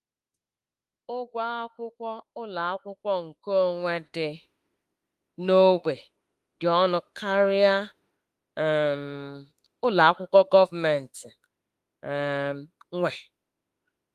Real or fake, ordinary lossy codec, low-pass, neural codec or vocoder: fake; Opus, 32 kbps; 14.4 kHz; autoencoder, 48 kHz, 32 numbers a frame, DAC-VAE, trained on Japanese speech